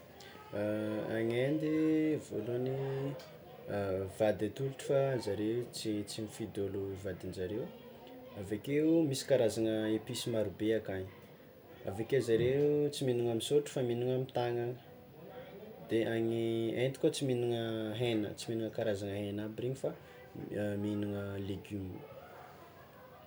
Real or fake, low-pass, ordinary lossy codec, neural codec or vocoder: real; none; none; none